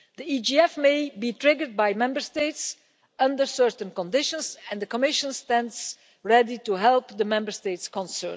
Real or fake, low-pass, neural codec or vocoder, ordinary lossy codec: real; none; none; none